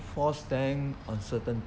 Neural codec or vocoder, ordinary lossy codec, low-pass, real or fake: none; none; none; real